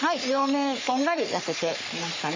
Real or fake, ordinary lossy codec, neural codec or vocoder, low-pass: fake; MP3, 64 kbps; codec, 44.1 kHz, 3.4 kbps, Pupu-Codec; 7.2 kHz